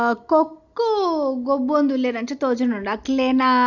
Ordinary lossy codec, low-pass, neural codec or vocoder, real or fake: none; 7.2 kHz; none; real